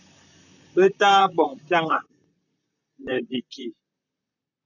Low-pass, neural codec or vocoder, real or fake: 7.2 kHz; vocoder, 44.1 kHz, 128 mel bands, Pupu-Vocoder; fake